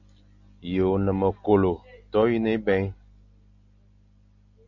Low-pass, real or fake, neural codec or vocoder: 7.2 kHz; real; none